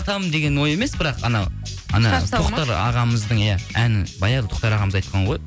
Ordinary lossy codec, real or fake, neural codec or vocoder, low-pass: none; real; none; none